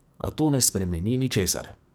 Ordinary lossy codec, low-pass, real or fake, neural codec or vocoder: none; none; fake; codec, 44.1 kHz, 2.6 kbps, SNAC